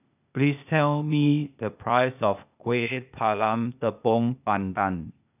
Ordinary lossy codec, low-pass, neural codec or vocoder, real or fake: none; 3.6 kHz; codec, 16 kHz, 0.8 kbps, ZipCodec; fake